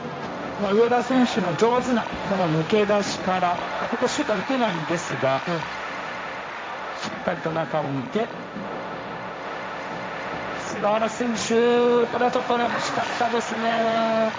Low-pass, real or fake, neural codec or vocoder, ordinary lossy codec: none; fake; codec, 16 kHz, 1.1 kbps, Voila-Tokenizer; none